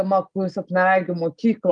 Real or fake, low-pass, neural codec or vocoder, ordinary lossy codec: real; 9.9 kHz; none; Opus, 32 kbps